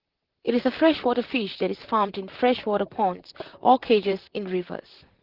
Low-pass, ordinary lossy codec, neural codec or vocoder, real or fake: 5.4 kHz; Opus, 16 kbps; vocoder, 44.1 kHz, 128 mel bands, Pupu-Vocoder; fake